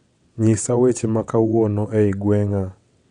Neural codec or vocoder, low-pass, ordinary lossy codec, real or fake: vocoder, 22.05 kHz, 80 mel bands, WaveNeXt; 9.9 kHz; none; fake